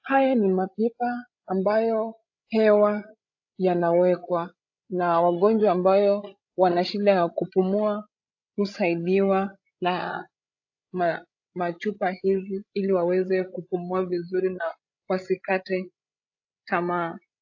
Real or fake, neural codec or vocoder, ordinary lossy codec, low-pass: fake; codec, 16 kHz, 16 kbps, FreqCodec, larger model; AAC, 48 kbps; 7.2 kHz